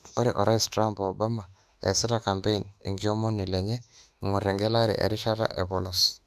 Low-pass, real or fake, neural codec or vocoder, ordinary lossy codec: 14.4 kHz; fake; autoencoder, 48 kHz, 32 numbers a frame, DAC-VAE, trained on Japanese speech; none